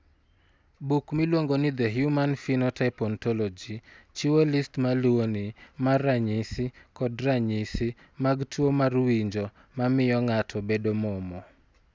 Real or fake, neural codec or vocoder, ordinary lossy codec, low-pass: real; none; none; none